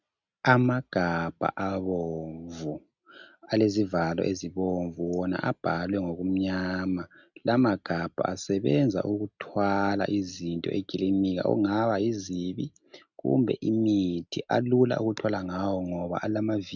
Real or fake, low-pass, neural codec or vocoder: real; 7.2 kHz; none